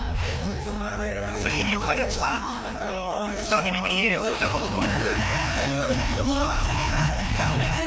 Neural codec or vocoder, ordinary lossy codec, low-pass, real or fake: codec, 16 kHz, 1 kbps, FreqCodec, larger model; none; none; fake